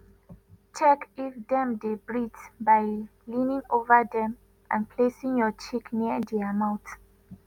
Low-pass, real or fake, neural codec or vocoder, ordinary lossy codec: 19.8 kHz; real; none; none